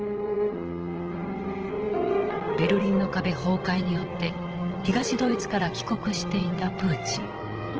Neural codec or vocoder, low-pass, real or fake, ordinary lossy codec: vocoder, 22.05 kHz, 80 mel bands, WaveNeXt; 7.2 kHz; fake; Opus, 16 kbps